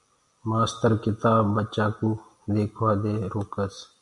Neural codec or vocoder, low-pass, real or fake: none; 10.8 kHz; real